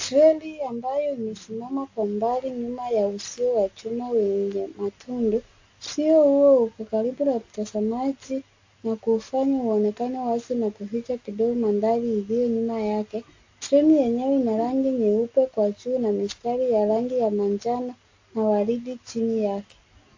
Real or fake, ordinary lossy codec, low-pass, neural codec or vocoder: real; AAC, 48 kbps; 7.2 kHz; none